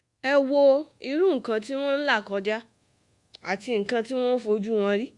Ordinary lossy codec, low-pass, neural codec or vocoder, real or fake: Opus, 64 kbps; 10.8 kHz; codec, 24 kHz, 1.2 kbps, DualCodec; fake